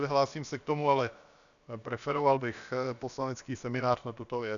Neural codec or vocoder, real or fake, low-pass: codec, 16 kHz, about 1 kbps, DyCAST, with the encoder's durations; fake; 7.2 kHz